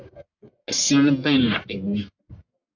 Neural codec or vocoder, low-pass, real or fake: codec, 44.1 kHz, 1.7 kbps, Pupu-Codec; 7.2 kHz; fake